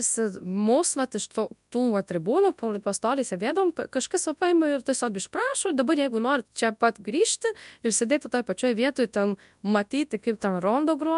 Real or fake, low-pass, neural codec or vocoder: fake; 10.8 kHz; codec, 24 kHz, 0.9 kbps, WavTokenizer, large speech release